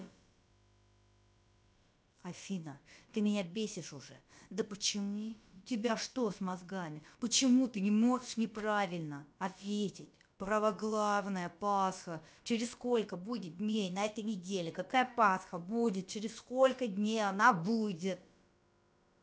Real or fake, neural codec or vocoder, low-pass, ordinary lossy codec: fake; codec, 16 kHz, about 1 kbps, DyCAST, with the encoder's durations; none; none